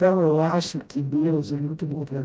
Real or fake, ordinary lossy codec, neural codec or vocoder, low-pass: fake; none; codec, 16 kHz, 0.5 kbps, FreqCodec, smaller model; none